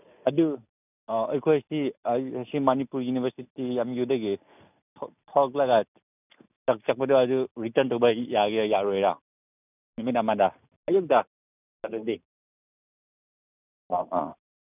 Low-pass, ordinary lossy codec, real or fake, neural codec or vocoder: 3.6 kHz; none; real; none